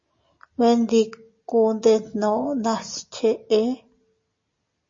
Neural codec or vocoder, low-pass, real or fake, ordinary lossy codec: none; 7.2 kHz; real; MP3, 32 kbps